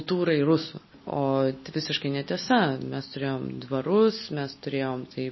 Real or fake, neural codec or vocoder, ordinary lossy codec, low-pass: real; none; MP3, 24 kbps; 7.2 kHz